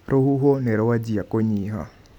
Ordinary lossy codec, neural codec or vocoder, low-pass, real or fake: none; none; 19.8 kHz; real